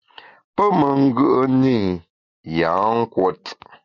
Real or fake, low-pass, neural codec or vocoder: real; 7.2 kHz; none